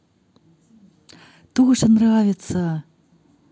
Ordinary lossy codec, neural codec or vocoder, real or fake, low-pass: none; none; real; none